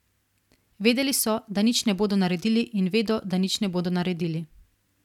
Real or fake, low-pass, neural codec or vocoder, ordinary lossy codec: real; 19.8 kHz; none; none